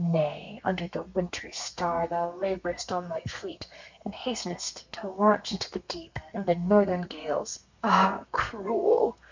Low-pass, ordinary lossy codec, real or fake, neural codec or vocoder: 7.2 kHz; MP3, 64 kbps; fake; codec, 32 kHz, 1.9 kbps, SNAC